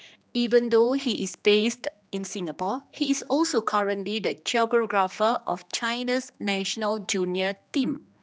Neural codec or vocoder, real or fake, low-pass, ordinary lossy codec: codec, 16 kHz, 2 kbps, X-Codec, HuBERT features, trained on general audio; fake; none; none